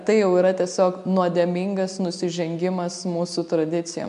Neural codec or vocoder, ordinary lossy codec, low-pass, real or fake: none; AAC, 96 kbps; 10.8 kHz; real